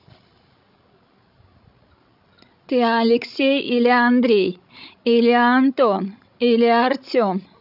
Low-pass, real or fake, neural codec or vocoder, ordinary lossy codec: 5.4 kHz; fake; codec, 16 kHz, 16 kbps, FreqCodec, larger model; none